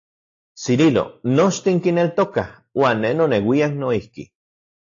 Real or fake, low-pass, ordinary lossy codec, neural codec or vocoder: real; 7.2 kHz; AAC, 48 kbps; none